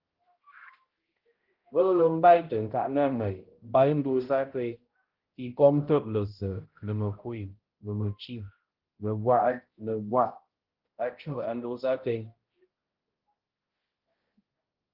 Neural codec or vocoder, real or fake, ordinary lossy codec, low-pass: codec, 16 kHz, 0.5 kbps, X-Codec, HuBERT features, trained on balanced general audio; fake; Opus, 16 kbps; 5.4 kHz